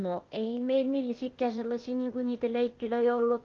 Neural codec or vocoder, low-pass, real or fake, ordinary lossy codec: codec, 16 kHz, 0.8 kbps, ZipCodec; 7.2 kHz; fake; Opus, 32 kbps